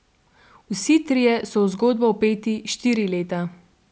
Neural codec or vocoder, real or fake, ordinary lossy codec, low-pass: none; real; none; none